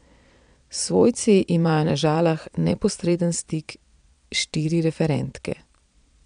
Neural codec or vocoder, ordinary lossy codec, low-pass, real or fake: vocoder, 22.05 kHz, 80 mel bands, Vocos; none; 9.9 kHz; fake